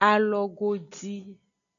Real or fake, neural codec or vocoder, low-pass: real; none; 7.2 kHz